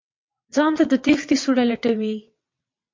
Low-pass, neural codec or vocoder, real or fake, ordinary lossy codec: 7.2 kHz; vocoder, 22.05 kHz, 80 mel bands, WaveNeXt; fake; MP3, 48 kbps